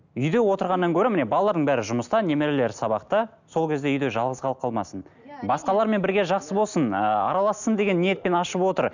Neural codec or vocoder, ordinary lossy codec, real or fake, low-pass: none; none; real; 7.2 kHz